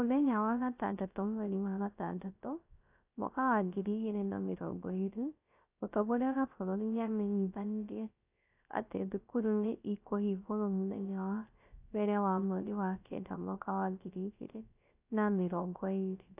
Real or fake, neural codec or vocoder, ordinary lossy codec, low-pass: fake; codec, 16 kHz, 0.3 kbps, FocalCodec; AAC, 32 kbps; 3.6 kHz